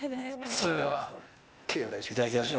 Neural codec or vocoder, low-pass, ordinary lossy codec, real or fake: codec, 16 kHz, 0.8 kbps, ZipCodec; none; none; fake